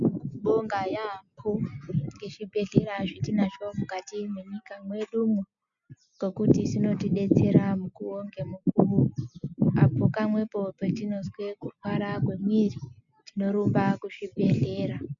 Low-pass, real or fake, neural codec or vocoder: 7.2 kHz; real; none